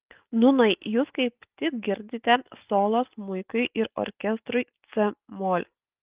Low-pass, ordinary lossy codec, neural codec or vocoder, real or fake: 3.6 kHz; Opus, 24 kbps; none; real